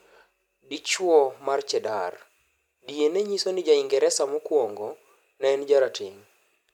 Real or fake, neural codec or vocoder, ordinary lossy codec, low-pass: real; none; MP3, 96 kbps; 19.8 kHz